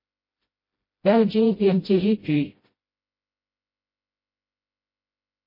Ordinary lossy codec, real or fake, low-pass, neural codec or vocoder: MP3, 24 kbps; fake; 5.4 kHz; codec, 16 kHz, 0.5 kbps, FreqCodec, smaller model